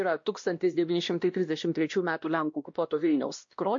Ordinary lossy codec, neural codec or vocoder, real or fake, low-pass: MP3, 48 kbps; codec, 16 kHz, 1 kbps, X-Codec, WavLM features, trained on Multilingual LibriSpeech; fake; 7.2 kHz